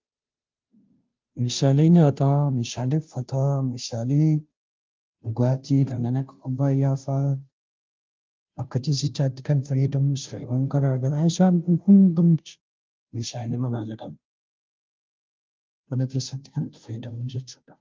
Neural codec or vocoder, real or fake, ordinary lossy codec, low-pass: codec, 16 kHz, 0.5 kbps, FunCodec, trained on Chinese and English, 25 frames a second; fake; Opus, 32 kbps; 7.2 kHz